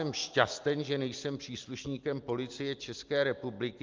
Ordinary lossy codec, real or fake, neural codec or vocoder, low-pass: Opus, 24 kbps; real; none; 7.2 kHz